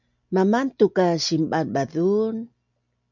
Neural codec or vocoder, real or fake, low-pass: none; real; 7.2 kHz